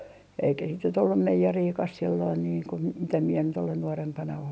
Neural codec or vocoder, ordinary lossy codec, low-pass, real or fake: none; none; none; real